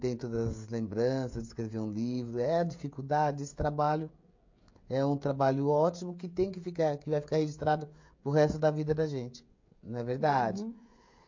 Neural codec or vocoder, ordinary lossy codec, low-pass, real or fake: codec, 16 kHz, 16 kbps, FreqCodec, smaller model; MP3, 48 kbps; 7.2 kHz; fake